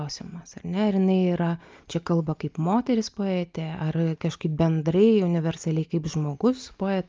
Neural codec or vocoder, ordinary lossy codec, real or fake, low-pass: none; Opus, 24 kbps; real; 7.2 kHz